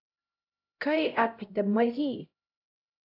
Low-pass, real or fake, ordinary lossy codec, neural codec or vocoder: 5.4 kHz; fake; AAC, 48 kbps; codec, 16 kHz, 0.5 kbps, X-Codec, HuBERT features, trained on LibriSpeech